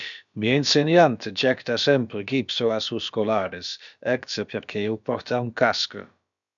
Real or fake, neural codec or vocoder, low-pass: fake; codec, 16 kHz, about 1 kbps, DyCAST, with the encoder's durations; 7.2 kHz